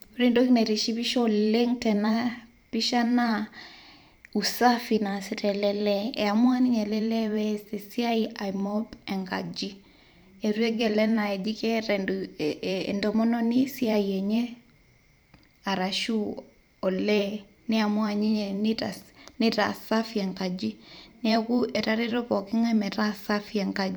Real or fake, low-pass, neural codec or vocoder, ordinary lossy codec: fake; none; vocoder, 44.1 kHz, 128 mel bands every 512 samples, BigVGAN v2; none